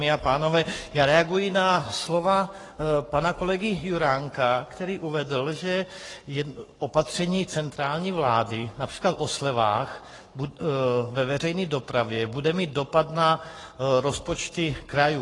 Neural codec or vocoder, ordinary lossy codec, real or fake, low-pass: codec, 44.1 kHz, 7.8 kbps, Pupu-Codec; AAC, 32 kbps; fake; 10.8 kHz